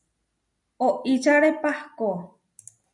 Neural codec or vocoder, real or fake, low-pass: none; real; 10.8 kHz